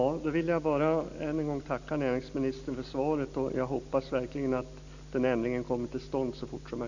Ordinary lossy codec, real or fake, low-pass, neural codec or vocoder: none; real; 7.2 kHz; none